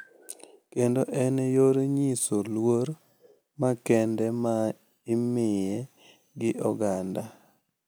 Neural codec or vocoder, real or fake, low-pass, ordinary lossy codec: none; real; none; none